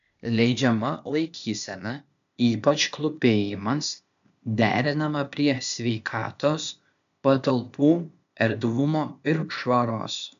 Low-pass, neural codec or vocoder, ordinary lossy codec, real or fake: 7.2 kHz; codec, 16 kHz, 0.8 kbps, ZipCodec; AAC, 96 kbps; fake